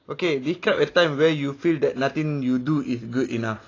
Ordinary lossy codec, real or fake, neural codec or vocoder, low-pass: AAC, 32 kbps; real; none; 7.2 kHz